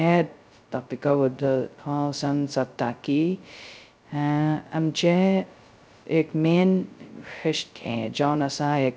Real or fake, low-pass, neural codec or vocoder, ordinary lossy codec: fake; none; codec, 16 kHz, 0.2 kbps, FocalCodec; none